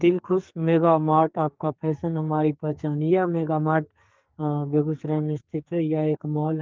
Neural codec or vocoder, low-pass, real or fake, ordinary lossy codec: codec, 44.1 kHz, 2.6 kbps, SNAC; 7.2 kHz; fake; Opus, 32 kbps